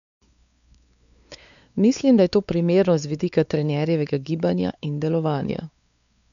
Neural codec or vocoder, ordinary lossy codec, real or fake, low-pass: codec, 16 kHz, 4 kbps, X-Codec, WavLM features, trained on Multilingual LibriSpeech; none; fake; 7.2 kHz